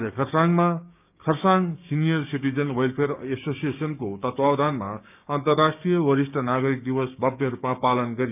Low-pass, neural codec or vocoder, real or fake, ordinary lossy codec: 3.6 kHz; codec, 16 kHz, 6 kbps, DAC; fake; none